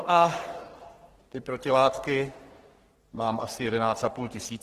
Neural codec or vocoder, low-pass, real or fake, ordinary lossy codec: codec, 44.1 kHz, 3.4 kbps, Pupu-Codec; 14.4 kHz; fake; Opus, 16 kbps